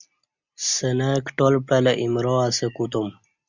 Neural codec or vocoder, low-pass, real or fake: none; 7.2 kHz; real